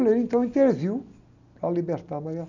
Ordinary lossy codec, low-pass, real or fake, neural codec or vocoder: none; 7.2 kHz; real; none